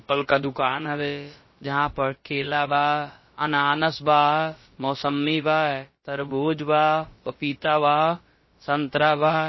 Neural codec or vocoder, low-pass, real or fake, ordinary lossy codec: codec, 16 kHz, about 1 kbps, DyCAST, with the encoder's durations; 7.2 kHz; fake; MP3, 24 kbps